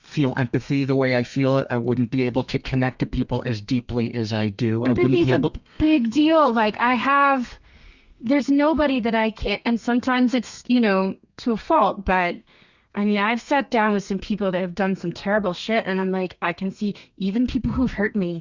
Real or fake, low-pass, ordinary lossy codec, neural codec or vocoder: fake; 7.2 kHz; Opus, 64 kbps; codec, 32 kHz, 1.9 kbps, SNAC